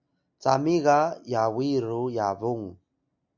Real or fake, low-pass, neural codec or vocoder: real; 7.2 kHz; none